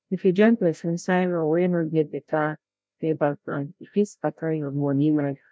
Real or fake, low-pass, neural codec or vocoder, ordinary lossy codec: fake; none; codec, 16 kHz, 0.5 kbps, FreqCodec, larger model; none